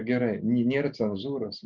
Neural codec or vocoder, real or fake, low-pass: none; real; 7.2 kHz